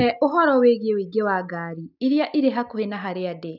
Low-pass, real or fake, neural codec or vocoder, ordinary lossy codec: 5.4 kHz; real; none; none